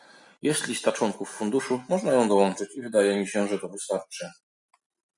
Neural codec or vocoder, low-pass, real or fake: none; 10.8 kHz; real